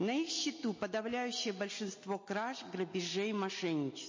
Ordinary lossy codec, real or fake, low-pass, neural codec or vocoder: MP3, 32 kbps; real; 7.2 kHz; none